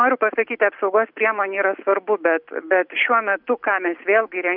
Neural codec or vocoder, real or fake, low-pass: none; real; 5.4 kHz